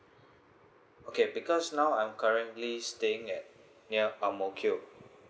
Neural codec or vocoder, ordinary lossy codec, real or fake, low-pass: none; none; real; none